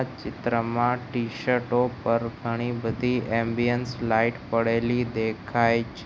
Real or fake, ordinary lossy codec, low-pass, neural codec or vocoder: real; none; none; none